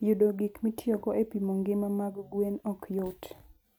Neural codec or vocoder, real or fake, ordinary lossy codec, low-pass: none; real; none; none